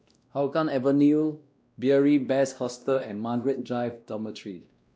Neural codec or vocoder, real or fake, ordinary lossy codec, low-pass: codec, 16 kHz, 1 kbps, X-Codec, WavLM features, trained on Multilingual LibriSpeech; fake; none; none